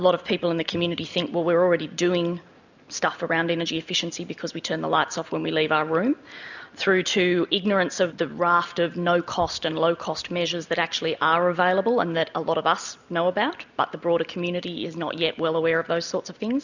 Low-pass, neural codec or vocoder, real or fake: 7.2 kHz; none; real